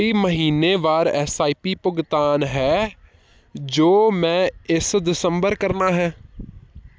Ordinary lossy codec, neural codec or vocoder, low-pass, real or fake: none; none; none; real